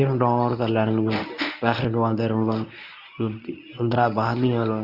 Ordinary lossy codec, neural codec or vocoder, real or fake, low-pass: none; codec, 24 kHz, 0.9 kbps, WavTokenizer, medium speech release version 1; fake; 5.4 kHz